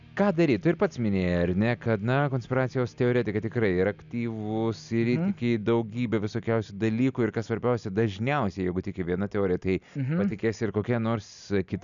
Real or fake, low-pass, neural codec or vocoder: real; 7.2 kHz; none